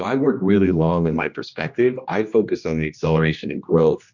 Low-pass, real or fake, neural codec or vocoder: 7.2 kHz; fake; codec, 16 kHz, 1 kbps, X-Codec, HuBERT features, trained on general audio